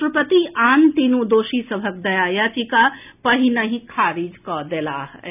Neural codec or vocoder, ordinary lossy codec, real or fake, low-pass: none; none; real; 3.6 kHz